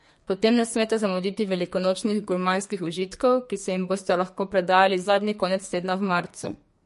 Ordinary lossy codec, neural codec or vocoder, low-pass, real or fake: MP3, 48 kbps; codec, 44.1 kHz, 2.6 kbps, SNAC; 14.4 kHz; fake